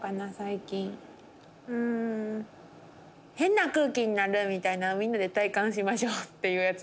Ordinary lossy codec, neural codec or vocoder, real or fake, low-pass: none; none; real; none